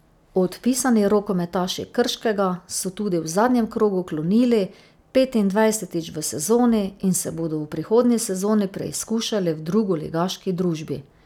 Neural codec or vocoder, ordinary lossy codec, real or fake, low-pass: none; none; real; 19.8 kHz